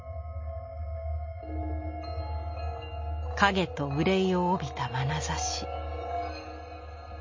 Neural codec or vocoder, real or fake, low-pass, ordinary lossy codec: none; real; 7.2 kHz; MP3, 32 kbps